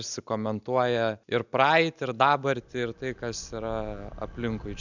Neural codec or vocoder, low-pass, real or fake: none; 7.2 kHz; real